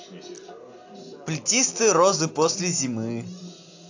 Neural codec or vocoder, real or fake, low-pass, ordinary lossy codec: none; real; 7.2 kHz; AAC, 48 kbps